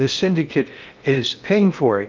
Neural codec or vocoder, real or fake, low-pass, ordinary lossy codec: codec, 16 kHz in and 24 kHz out, 0.6 kbps, FocalCodec, streaming, 2048 codes; fake; 7.2 kHz; Opus, 24 kbps